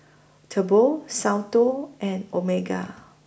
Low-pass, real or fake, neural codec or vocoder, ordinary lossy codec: none; real; none; none